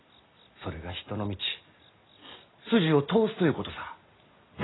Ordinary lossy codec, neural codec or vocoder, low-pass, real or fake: AAC, 16 kbps; none; 7.2 kHz; real